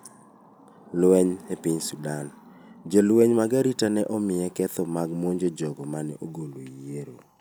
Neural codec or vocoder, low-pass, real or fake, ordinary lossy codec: none; none; real; none